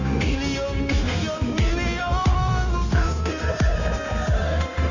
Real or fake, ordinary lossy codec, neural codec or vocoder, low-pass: fake; none; codec, 16 kHz, 0.9 kbps, LongCat-Audio-Codec; 7.2 kHz